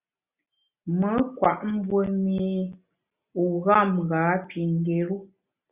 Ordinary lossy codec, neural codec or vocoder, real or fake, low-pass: Opus, 64 kbps; none; real; 3.6 kHz